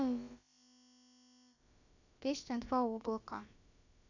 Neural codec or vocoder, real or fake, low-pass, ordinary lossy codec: codec, 16 kHz, about 1 kbps, DyCAST, with the encoder's durations; fake; 7.2 kHz; none